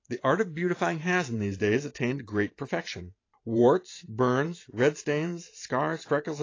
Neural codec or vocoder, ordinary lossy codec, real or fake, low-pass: vocoder, 44.1 kHz, 128 mel bands every 512 samples, BigVGAN v2; AAC, 32 kbps; fake; 7.2 kHz